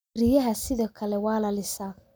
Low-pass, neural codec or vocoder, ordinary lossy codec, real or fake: none; none; none; real